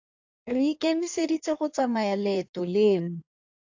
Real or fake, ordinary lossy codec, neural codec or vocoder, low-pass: fake; AAC, 48 kbps; codec, 16 kHz in and 24 kHz out, 1.1 kbps, FireRedTTS-2 codec; 7.2 kHz